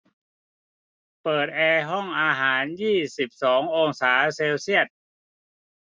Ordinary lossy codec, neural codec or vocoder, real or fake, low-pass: none; none; real; none